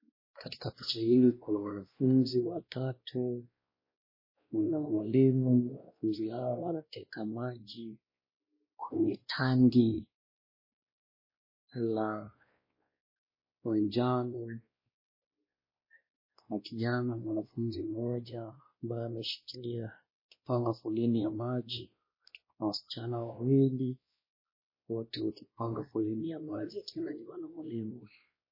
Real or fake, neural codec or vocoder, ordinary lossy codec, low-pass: fake; codec, 16 kHz, 1 kbps, X-Codec, WavLM features, trained on Multilingual LibriSpeech; MP3, 24 kbps; 5.4 kHz